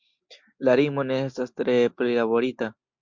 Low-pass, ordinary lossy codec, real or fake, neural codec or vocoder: 7.2 kHz; MP3, 64 kbps; real; none